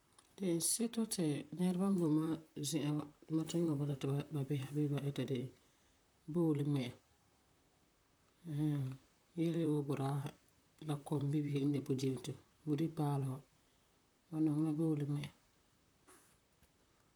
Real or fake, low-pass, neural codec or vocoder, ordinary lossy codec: fake; none; vocoder, 44.1 kHz, 128 mel bands, Pupu-Vocoder; none